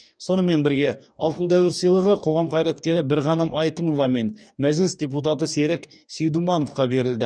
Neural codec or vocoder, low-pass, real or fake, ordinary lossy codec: codec, 44.1 kHz, 2.6 kbps, DAC; 9.9 kHz; fake; none